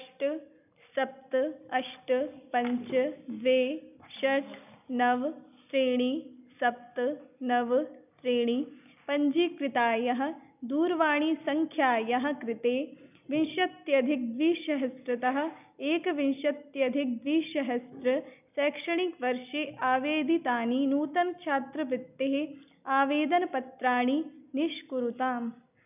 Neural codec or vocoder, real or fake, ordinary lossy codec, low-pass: none; real; none; 3.6 kHz